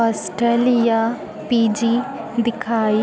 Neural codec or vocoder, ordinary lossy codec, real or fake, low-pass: none; none; real; none